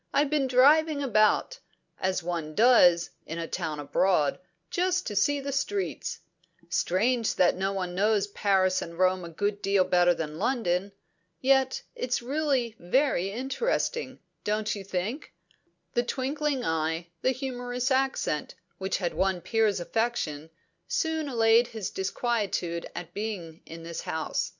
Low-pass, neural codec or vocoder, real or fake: 7.2 kHz; none; real